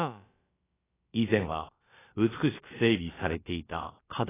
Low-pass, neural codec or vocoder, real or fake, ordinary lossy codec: 3.6 kHz; codec, 16 kHz, about 1 kbps, DyCAST, with the encoder's durations; fake; AAC, 16 kbps